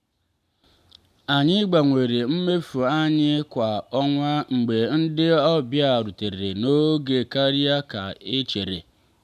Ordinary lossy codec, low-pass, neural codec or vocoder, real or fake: none; 14.4 kHz; none; real